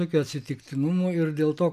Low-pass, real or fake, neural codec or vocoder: 14.4 kHz; real; none